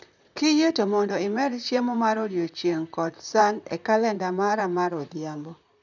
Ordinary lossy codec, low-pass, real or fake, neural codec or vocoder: none; 7.2 kHz; fake; vocoder, 44.1 kHz, 128 mel bands, Pupu-Vocoder